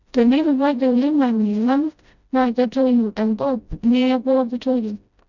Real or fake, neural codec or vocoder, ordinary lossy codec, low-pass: fake; codec, 16 kHz, 0.5 kbps, FreqCodec, smaller model; none; 7.2 kHz